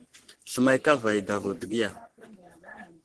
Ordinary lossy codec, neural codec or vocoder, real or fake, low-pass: Opus, 16 kbps; codec, 44.1 kHz, 3.4 kbps, Pupu-Codec; fake; 10.8 kHz